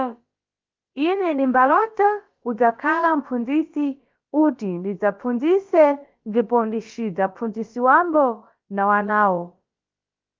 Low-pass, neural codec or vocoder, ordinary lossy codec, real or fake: 7.2 kHz; codec, 16 kHz, about 1 kbps, DyCAST, with the encoder's durations; Opus, 24 kbps; fake